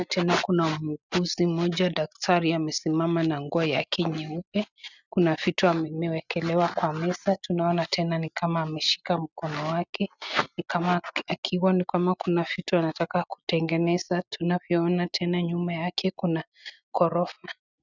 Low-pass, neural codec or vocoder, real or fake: 7.2 kHz; none; real